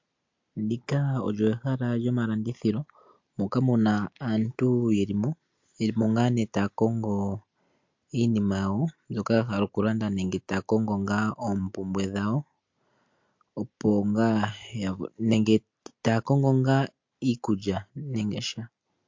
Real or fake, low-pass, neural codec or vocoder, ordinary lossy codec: real; 7.2 kHz; none; MP3, 48 kbps